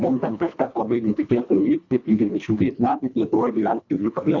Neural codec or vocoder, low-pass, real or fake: codec, 24 kHz, 1.5 kbps, HILCodec; 7.2 kHz; fake